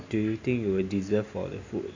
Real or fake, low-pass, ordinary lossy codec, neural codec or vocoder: real; 7.2 kHz; AAC, 48 kbps; none